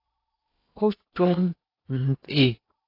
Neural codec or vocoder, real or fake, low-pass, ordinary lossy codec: codec, 16 kHz in and 24 kHz out, 0.8 kbps, FocalCodec, streaming, 65536 codes; fake; 5.4 kHz; AAC, 32 kbps